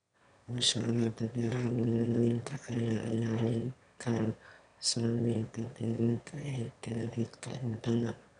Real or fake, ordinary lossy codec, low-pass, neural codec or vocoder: fake; none; 9.9 kHz; autoencoder, 22.05 kHz, a latent of 192 numbers a frame, VITS, trained on one speaker